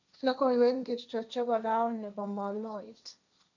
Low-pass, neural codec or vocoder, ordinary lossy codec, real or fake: 7.2 kHz; codec, 16 kHz, 1.1 kbps, Voila-Tokenizer; none; fake